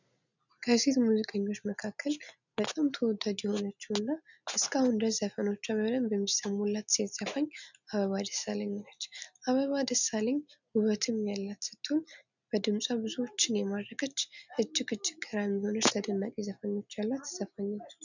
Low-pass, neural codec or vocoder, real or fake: 7.2 kHz; none; real